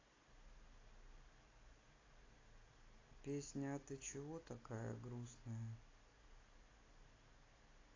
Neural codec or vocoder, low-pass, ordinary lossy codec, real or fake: none; 7.2 kHz; AAC, 32 kbps; real